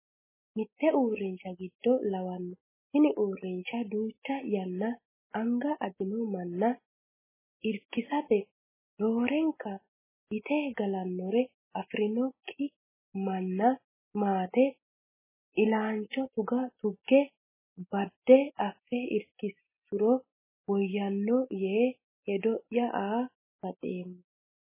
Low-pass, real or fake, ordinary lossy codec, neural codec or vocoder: 3.6 kHz; real; MP3, 16 kbps; none